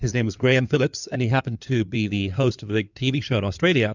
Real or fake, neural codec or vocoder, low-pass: fake; codec, 16 kHz in and 24 kHz out, 2.2 kbps, FireRedTTS-2 codec; 7.2 kHz